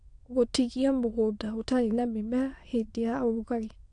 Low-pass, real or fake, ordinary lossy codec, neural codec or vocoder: 9.9 kHz; fake; AAC, 48 kbps; autoencoder, 22.05 kHz, a latent of 192 numbers a frame, VITS, trained on many speakers